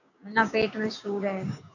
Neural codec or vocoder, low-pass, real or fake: codec, 16 kHz, 6 kbps, DAC; 7.2 kHz; fake